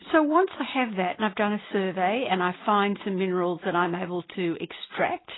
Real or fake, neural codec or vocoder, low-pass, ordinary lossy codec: real; none; 7.2 kHz; AAC, 16 kbps